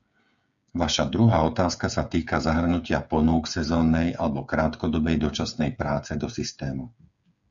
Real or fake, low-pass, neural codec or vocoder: fake; 7.2 kHz; codec, 16 kHz, 8 kbps, FreqCodec, smaller model